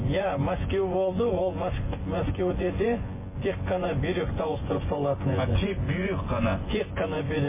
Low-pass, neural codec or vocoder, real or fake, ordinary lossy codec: 3.6 kHz; vocoder, 24 kHz, 100 mel bands, Vocos; fake; MP3, 16 kbps